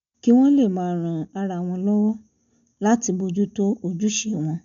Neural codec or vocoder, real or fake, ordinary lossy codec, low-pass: none; real; none; 7.2 kHz